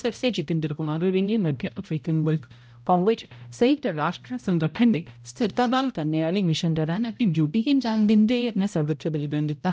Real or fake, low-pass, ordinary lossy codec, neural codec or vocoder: fake; none; none; codec, 16 kHz, 0.5 kbps, X-Codec, HuBERT features, trained on balanced general audio